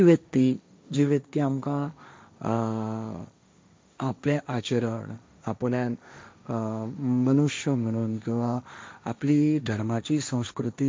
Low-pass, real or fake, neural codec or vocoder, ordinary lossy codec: none; fake; codec, 16 kHz, 1.1 kbps, Voila-Tokenizer; none